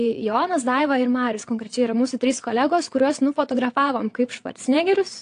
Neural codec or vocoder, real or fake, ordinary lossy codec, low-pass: vocoder, 22.05 kHz, 80 mel bands, WaveNeXt; fake; AAC, 48 kbps; 9.9 kHz